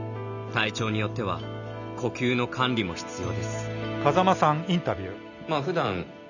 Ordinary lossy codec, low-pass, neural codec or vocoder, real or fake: none; 7.2 kHz; none; real